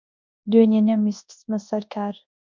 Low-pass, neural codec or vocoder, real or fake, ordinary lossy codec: 7.2 kHz; codec, 24 kHz, 0.9 kbps, WavTokenizer, large speech release; fake; MP3, 64 kbps